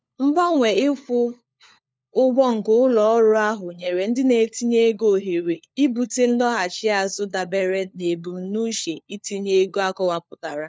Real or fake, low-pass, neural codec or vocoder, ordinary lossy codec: fake; none; codec, 16 kHz, 4 kbps, FunCodec, trained on LibriTTS, 50 frames a second; none